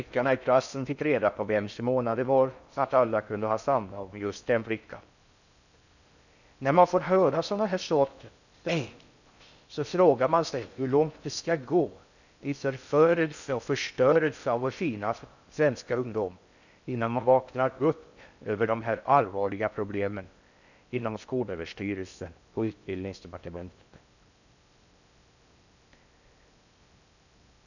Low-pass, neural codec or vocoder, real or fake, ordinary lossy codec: 7.2 kHz; codec, 16 kHz in and 24 kHz out, 0.6 kbps, FocalCodec, streaming, 4096 codes; fake; none